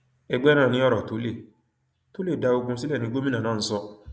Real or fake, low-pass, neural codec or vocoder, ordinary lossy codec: real; none; none; none